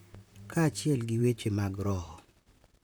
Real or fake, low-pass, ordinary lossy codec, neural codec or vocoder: fake; none; none; vocoder, 44.1 kHz, 128 mel bands, Pupu-Vocoder